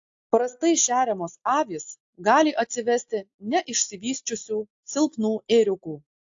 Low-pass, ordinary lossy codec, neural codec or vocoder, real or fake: 7.2 kHz; AAC, 48 kbps; none; real